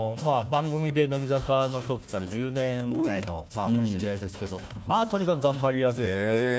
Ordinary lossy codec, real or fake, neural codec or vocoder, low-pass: none; fake; codec, 16 kHz, 1 kbps, FunCodec, trained on Chinese and English, 50 frames a second; none